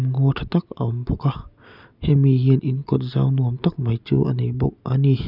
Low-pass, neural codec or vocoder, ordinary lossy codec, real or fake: 5.4 kHz; none; none; real